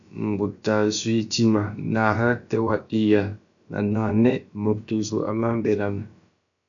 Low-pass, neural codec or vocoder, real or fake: 7.2 kHz; codec, 16 kHz, about 1 kbps, DyCAST, with the encoder's durations; fake